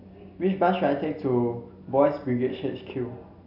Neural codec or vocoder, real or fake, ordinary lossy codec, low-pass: none; real; AAC, 48 kbps; 5.4 kHz